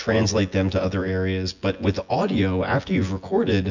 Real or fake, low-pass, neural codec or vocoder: fake; 7.2 kHz; vocoder, 24 kHz, 100 mel bands, Vocos